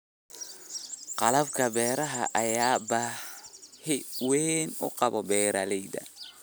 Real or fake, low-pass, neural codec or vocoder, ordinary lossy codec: real; none; none; none